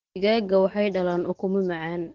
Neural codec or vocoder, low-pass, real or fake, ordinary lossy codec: none; 7.2 kHz; real; Opus, 16 kbps